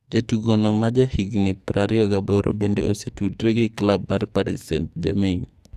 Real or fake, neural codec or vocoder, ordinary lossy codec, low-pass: fake; codec, 44.1 kHz, 2.6 kbps, SNAC; Opus, 64 kbps; 14.4 kHz